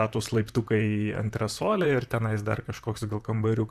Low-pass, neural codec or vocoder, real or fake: 14.4 kHz; vocoder, 44.1 kHz, 128 mel bands, Pupu-Vocoder; fake